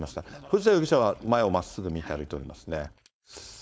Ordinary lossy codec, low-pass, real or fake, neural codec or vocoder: none; none; fake; codec, 16 kHz, 4.8 kbps, FACodec